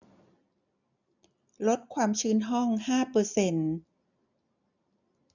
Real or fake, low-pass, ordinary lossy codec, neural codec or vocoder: real; 7.2 kHz; none; none